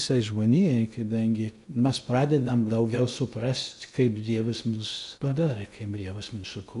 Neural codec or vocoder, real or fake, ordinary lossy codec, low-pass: codec, 16 kHz in and 24 kHz out, 0.6 kbps, FocalCodec, streaming, 2048 codes; fake; AAC, 96 kbps; 10.8 kHz